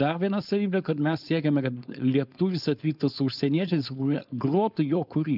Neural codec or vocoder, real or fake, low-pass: codec, 16 kHz, 4.8 kbps, FACodec; fake; 5.4 kHz